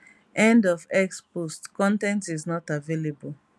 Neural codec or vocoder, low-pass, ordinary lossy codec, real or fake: none; none; none; real